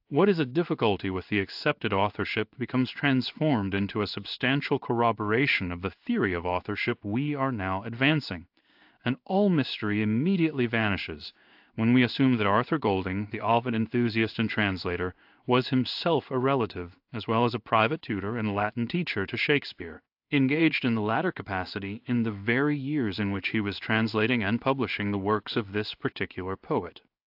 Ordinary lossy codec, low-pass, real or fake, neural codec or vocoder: AAC, 48 kbps; 5.4 kHz; fake; codec, 16 kHz in and 24 kHz out, 1 kbps, XY-Tokenizer